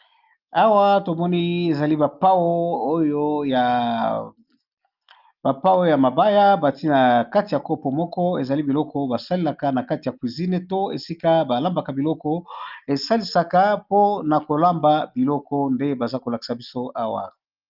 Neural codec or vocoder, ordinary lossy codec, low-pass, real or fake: none; Opus, 24 kbps; 5.4 kHz; real